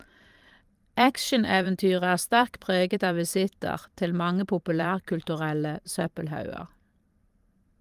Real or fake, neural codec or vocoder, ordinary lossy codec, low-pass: fake; vocoder, 44.1 kHz, 128 mel bands every 512 samples, BigVGAN v2; Opus, 32 kbps; 14.4 kHz